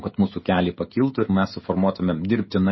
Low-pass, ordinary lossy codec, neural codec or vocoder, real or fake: 7.2 kHz; MP3, 24 kbps; codec, 16 kHz, 16 kbps, FreqCodec, smaller model; fake